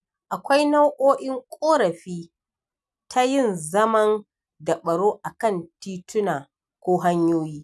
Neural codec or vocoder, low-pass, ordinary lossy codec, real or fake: none; none; none; real